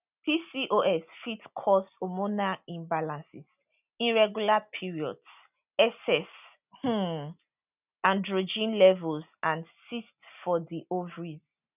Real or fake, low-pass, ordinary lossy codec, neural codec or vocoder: real; 3.6 kHz; none; none